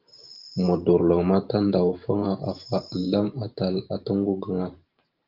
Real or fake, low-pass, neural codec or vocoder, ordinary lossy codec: fake; 5.4 kHz; vocoder, 44.1 kHz, 128 mel bands every 512 samples, BigVGAN v2; Opus, 32 kbps